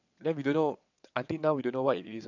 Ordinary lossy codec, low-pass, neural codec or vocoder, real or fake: none; 7.2 kHz; vocoder, 22.05 kHz, 80 mel bands, WaveNeXt; fake